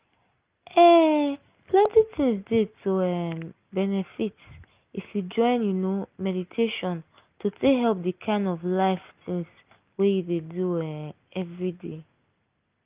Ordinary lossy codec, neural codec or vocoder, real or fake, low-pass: Opus, 24 kbps; none; real; 3.6 kHz